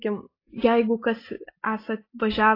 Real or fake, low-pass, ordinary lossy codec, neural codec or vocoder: real; 5.4 kHz; AAC, 24 kbps; none